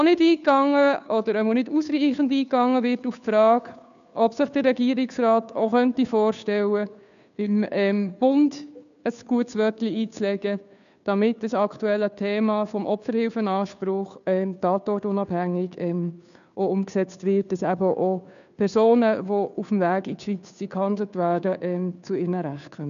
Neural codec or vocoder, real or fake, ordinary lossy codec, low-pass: codec, 16 kHz, 2 kbps, FunCodec, trained on Chinese and English, 25 frames a second; fake; none; 7.2 kHz